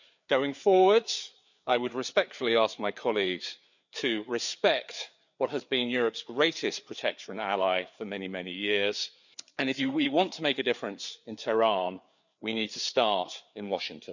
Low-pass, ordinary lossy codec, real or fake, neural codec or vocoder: 7.2 kHz; none; fake; codec, 16 kHz, 4 kbps, FreqCodec, larger model